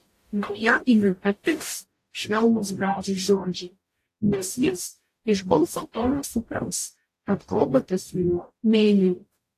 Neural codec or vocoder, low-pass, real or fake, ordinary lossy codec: codec, 44.1 kHz, 0.9 kbps, DAC; 14.4 kHz; fake; AAC, 64 kbps